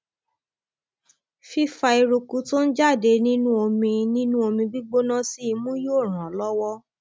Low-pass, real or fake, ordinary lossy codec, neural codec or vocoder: none; real; none; none